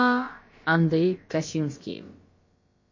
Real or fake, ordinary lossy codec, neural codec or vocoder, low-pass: fake; MP3, 32 kbps; codec, 16 kHz, about 1 kbps, DyCAST, with the encoder's durations; 7.2 kHz